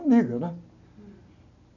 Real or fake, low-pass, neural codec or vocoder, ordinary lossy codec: real; 7.2 kHz; none; none